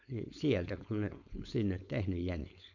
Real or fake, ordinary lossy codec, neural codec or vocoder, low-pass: fake; none; codec, 16 kHz, 4.8 kbps, FACodec; 7.2 kHz